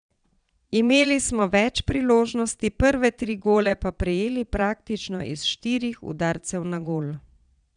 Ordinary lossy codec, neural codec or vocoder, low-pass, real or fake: none; vocoder, 22.05 kHz, 80 mel bands, Vocos; 9.9 kHz; fake